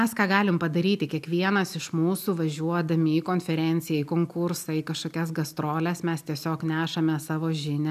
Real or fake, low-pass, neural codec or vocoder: real; 14.4 kHz; none